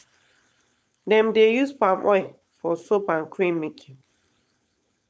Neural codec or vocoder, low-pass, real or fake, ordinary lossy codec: codec, 16 kHz, 4.8 kbps, FACodec; none; fake; none